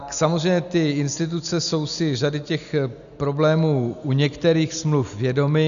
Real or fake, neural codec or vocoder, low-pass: real; none; 7.2 kHz